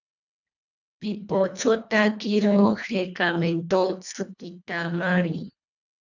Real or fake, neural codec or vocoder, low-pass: fake; codec, 24 kHz, 1.5 kbps, HILCodec; 7.2 kHz